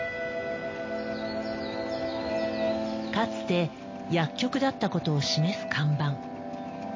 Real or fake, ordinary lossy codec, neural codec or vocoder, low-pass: real; MP3, 32 kbps; none; 7.2 kHz